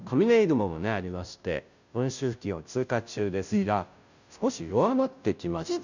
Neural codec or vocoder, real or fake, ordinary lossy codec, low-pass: codec, 16 kHz, 0.5 kbps, FunCodec, trained on Chinese and English, 25 frames a second; fake; none; 7.2 kHz